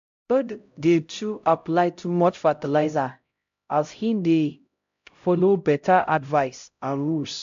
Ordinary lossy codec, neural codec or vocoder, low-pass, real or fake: AAC, 64 kbps; codec, 16 kHz, 0.5 kbps, X-Codec, HuBERT features, trained on LibriSpeech; 7.2 kHz; fake